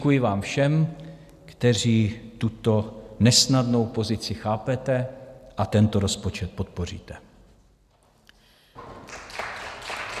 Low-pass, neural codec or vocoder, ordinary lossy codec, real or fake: 14.4 kHz; none; MP3, 96 kbps; real